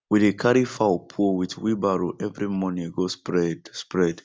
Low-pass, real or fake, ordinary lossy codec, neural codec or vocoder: none; real; none; none